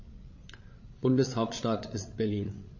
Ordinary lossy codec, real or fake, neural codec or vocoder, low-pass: MP3, 32 kbps; fake; codec, 16 kHz, 8 kbps, FreqCodec, larger model; 7.2 kHz